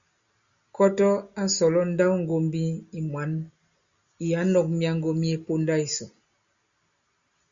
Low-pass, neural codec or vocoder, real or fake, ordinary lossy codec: 7.2 kHz; none; real; Opus, 64 kbps